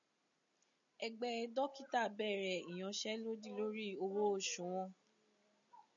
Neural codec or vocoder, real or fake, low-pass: none; real; 7.2 kHz